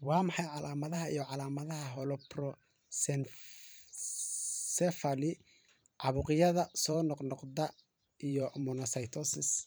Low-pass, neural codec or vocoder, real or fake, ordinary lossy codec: none; vocoder, 44.1 kHz, 128 mel bands every 512 samples, BigVGAN v2; fake; none